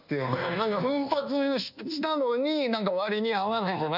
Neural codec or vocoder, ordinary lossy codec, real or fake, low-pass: codec, 24 kHz, 1.2 kbps, DualCodec; none; fake; 5.4 kHz